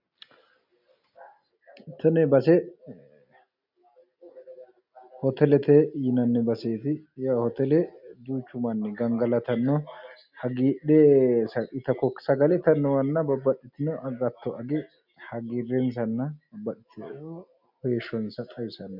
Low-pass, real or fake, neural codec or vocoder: 5.4 kHz; real; none